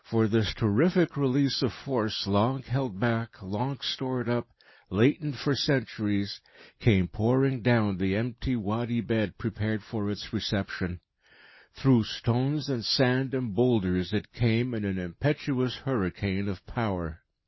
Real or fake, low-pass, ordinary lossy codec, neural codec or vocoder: real; 7.2 kHz; MP3, 24 kbps; none